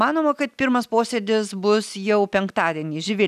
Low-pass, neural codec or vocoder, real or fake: 14.4 kHz; none; real